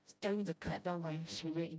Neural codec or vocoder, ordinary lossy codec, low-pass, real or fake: codec, 16 kHz, 0.5 kbps, FreqCodec, smaller model; none; none; fake